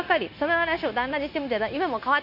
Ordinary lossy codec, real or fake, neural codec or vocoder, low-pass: none; fake; codec, 16 kHz, 0.9 kbps, LongCat-Audio-Codec; 5.4 kHz